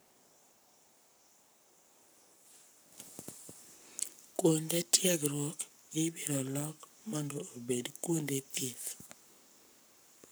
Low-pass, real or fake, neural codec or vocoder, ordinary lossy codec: none; fake; codec, 44.1 kHz, 7.8 kbps, Pupu-Codec; none